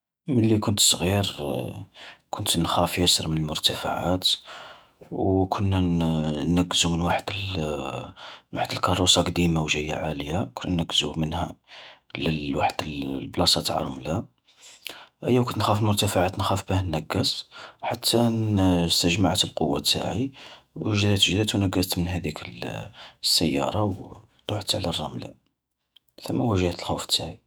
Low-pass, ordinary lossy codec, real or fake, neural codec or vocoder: none; none; fake; autoencoder, 48 kHz, 128 numbers a frame, DAC-VAE, trained on Japanese speech